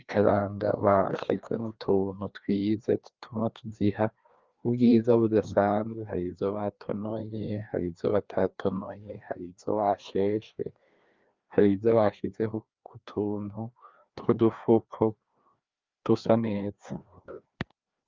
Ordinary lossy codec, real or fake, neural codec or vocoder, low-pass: Opus, 24 kbps; fake; codec, 16 kHz, 2 kbps, FreqCodec, larger model; 7.2 kHz